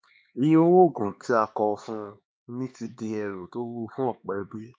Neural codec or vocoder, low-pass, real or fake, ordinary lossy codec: codec, 16 kHz, 2 kbps, X-Codec, HuBERT features, trained on LibriSpeech; none; fake; none